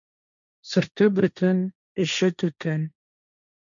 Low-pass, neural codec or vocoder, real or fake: 7.2 kHz; codec, 16 kHz, 1.1 kbps, Voila-Tokenizer; fake